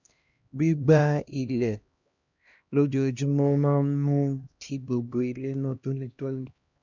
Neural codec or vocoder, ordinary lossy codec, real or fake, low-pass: codec, 16 kHz, 1 kbps, X-Codec, HuBERT features, trained on LibriSpeech; MP3, 64 kbps; fake; 7.2 kHz